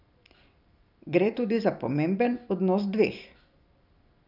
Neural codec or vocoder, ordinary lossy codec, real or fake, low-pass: vocoder, 44.1 kHz, 128 mel bands every 256 samples, BigVGAN v2; none; fake; 5.4 kHz